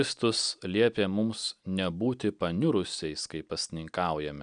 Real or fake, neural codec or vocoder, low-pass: real; none; 9.9 kHz